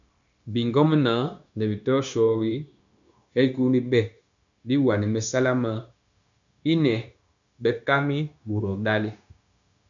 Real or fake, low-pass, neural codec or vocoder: fake; 7.2 kHz; codec, 16 kHz, 0.9 kbps, LongCat-Audio-Codec